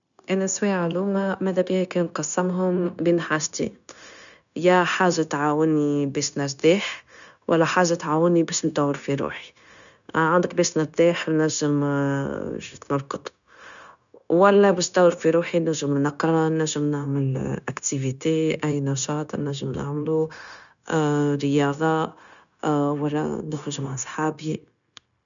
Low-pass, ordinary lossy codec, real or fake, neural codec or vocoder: 7.2 kHz; none; fake; codec, 16 kHz, 0.9 kbps, LongCat-Audio-Codec